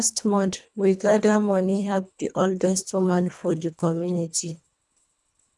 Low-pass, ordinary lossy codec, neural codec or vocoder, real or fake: none; none; codec, 24 kHz, 1.5 kbps, HILCodec; fake